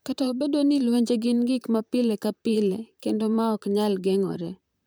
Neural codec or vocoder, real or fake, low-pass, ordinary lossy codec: vocoder, 44.1 kHz, 128 mel bands, Pupu-Vocoder; fake; none; none